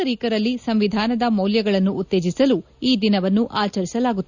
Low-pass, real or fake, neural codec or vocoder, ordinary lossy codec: 7.2 kHz; real; none; none